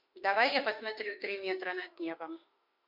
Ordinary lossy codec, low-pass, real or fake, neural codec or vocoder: MP3, 48 kbps; 5.4 kHz; fake; autoencoder, 48 kHz, 32 numbers a frame, DAC-VAE, trained on Japanese speech